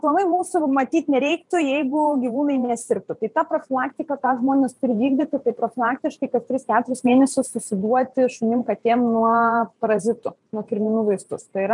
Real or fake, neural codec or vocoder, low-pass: fake; vocoder, 44.1 kHz, 128 mel bands every 512 samples, BigVGAN v2; 10.8 kHz